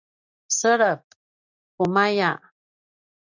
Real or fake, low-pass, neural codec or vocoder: real; 7.2 kHz; none